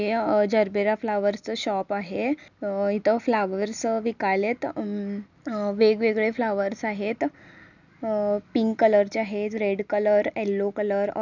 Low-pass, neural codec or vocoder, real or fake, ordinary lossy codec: 7.2 kHz; none; real; none